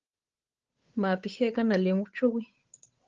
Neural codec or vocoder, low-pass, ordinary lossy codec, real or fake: codec, 16 kHz, 8 kbps, FunCodec, trained on Chinese and English, 25 frames a second; 7.2 kHz; Opus, 16 kbps; fake